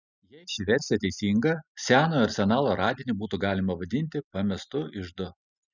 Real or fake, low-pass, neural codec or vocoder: real; 7.2 kHz; none